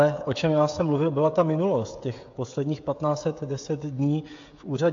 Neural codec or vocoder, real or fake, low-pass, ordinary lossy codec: codec, 16 kHz, 16 kbps, FreqCodec, smaller model; fake; 7.2 kHz; AAC, 48 kbps